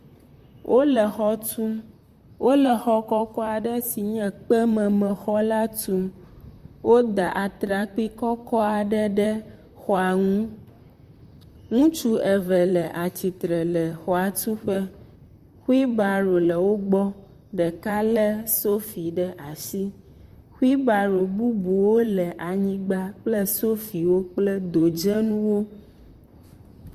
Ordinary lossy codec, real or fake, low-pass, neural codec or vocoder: Opus, 64 kbps; fake; 14.4 kHz; vocoder, 44.1 kHz, 128 mel bands, Pupu-Vocoder